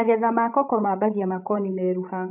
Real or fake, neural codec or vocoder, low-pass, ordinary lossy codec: fake; codec, 16 kHz, 16 kbps, FreqCodec, larger model; 3.6 kHz; AAC, 32 kbps